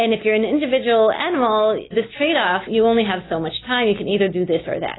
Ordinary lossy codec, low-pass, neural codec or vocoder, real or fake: AAC, 16 kbps; 7.2 kHz; none; real